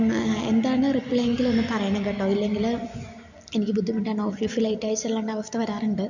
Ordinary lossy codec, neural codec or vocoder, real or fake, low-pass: none; none; real; 7.2 kHz